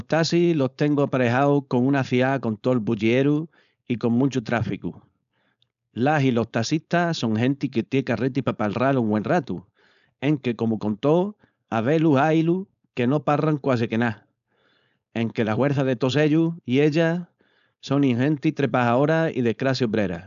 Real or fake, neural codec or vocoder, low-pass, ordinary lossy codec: fake; codec, 16 kHz, 4.8 kbps, FACodec; 7.2 kHz; MP3, 96 kbps